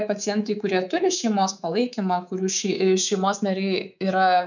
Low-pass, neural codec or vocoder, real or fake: 7.2 kHz; codec, 24 kHz, 3.1 kbps, DualCodec; fake